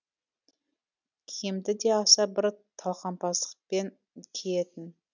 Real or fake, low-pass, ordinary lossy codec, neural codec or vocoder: real; 7.2 kHz; none; none